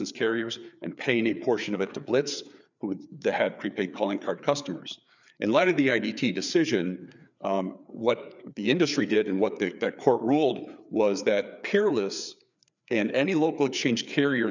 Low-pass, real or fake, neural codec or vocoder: 7.2 kHz; fake; codec, 16 kHz, 4 kbps, FreqCodec, larger model